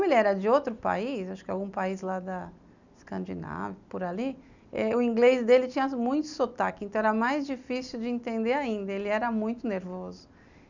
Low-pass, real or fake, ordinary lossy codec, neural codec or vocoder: 7.2 kHz; real; none; none